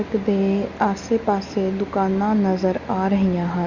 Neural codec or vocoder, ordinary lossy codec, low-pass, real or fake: none; Opus, 64 kbps; 7.2 kHz; real